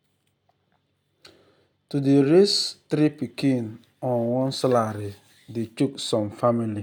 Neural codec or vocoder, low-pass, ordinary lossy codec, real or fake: none; 19.8 kHz; none; real